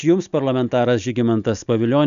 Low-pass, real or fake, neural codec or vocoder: 7.2 kHz; real; none